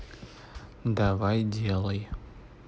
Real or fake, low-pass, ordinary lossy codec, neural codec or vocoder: real; none; none; none